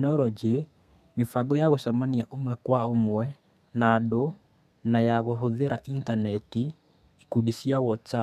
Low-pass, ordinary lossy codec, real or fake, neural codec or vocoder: 14.4 kHz; MP3, 96 kbps; fake; codec, 32 kHz, 1.9 kbps, SNAC